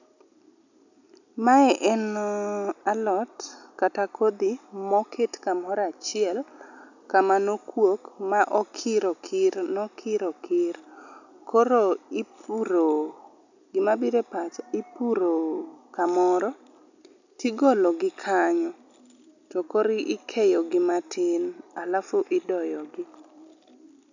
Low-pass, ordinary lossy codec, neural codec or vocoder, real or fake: 7.2 kHz; none; none; real